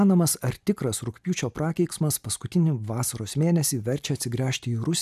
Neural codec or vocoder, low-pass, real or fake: none; 14.4 kHz; real